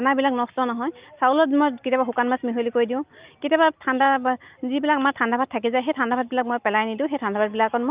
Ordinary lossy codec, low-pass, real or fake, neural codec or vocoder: Opus, 24 kbps; 3.6 kHz; real; none